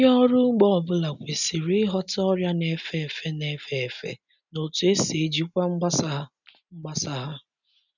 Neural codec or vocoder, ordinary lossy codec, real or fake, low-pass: none; none; real; 7.2 kHz